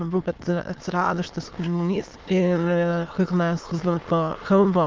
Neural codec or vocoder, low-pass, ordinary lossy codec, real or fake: autoencoder, 22.05 kHz, a latent of 192 numbers a frame, VITS, trained on many speakers; 7.2 kHz; Opus, 24 kbps; fake